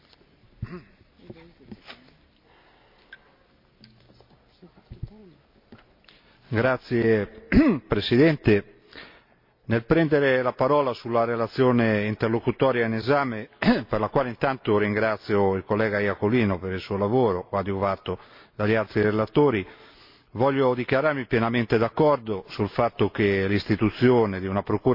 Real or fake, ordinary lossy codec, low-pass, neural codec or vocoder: real; MP3, 32 kbps; 5.4 kHz; none